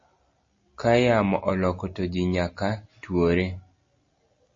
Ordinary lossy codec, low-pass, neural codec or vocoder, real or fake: MP3, 32 kbps; 7.2 kHz; none; real